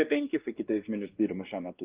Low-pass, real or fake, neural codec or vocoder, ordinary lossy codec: 3.6 kHz; fake; codec, 16 kHz, 2 kbps, X-Codec, WavLM features, trained on Multilingual LibriSpeech; Opus, 32 kbps